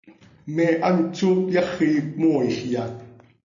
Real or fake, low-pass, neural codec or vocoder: real; 7.2 kHz; none